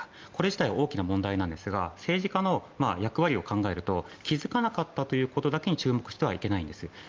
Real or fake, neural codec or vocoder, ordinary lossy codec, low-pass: real; none; Opus, 32 kbps; 7.2 kHz